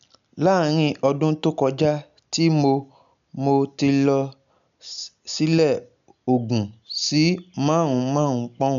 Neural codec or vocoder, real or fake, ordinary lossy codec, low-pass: none; real; none; 7.2 kHz